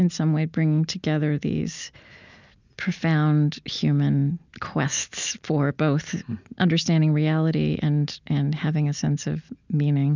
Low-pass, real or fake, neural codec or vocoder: 7.2 kHz; real; none